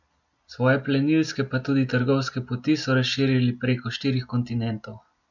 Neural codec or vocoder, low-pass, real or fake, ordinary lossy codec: none; 7.2 kHz; real; none